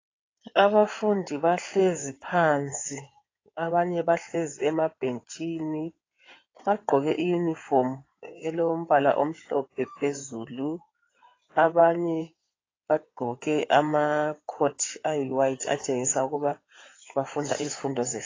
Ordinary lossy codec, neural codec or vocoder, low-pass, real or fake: AAC, 32 kbps; codec, 16 kHz in and 24 kHz out, 2.2 kbps, FireRedTTS-2 codec; 7.2 kHz; fake